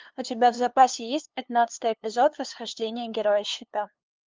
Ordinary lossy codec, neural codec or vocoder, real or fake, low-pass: Opus, 24 kbps; codec, 16 kHz, 2 kbps, FunCodec, trained on LibriTTS, 25 frames a second; fake; 7.2 kHz